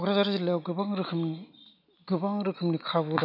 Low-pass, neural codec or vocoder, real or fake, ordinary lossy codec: 5.4 kHz; none; real; none